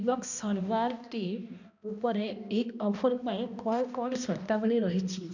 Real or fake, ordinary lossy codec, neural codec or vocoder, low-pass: fake; none; codec, 16 kHz, 1 kbps, X-Codec, HuBERT features, trained on balanced general audio; 7.2 kHz